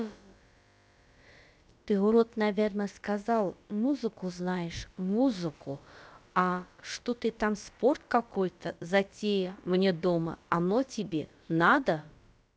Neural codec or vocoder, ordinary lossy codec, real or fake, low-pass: codec, 16 kHz, about 1 kbps, DyCAST, with the encoder's durations; none; fake; none